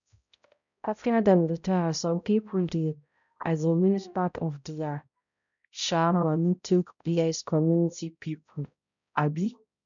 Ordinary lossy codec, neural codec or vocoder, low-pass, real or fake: none; codec, 16 kHz, 0.5 kbps, X-Codec, HuBERT features, trained on balanced general audio; 7.2 kHz; fake